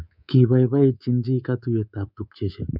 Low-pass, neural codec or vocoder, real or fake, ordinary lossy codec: 5.4 kHz; codec, 24 kHz, 3.1 kbps, DualCodec; fake; none